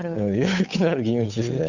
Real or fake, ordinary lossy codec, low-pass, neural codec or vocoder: fake; none; 7.2 kHz; codec, 16 kHz, 8 kbps, FunCodec, trained on Chinese and English, 25 frames a second